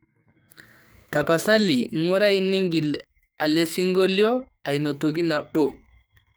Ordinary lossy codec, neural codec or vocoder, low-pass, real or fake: none; codec, 44.1 kHz, 2.6 kbps, SNAC; none; fake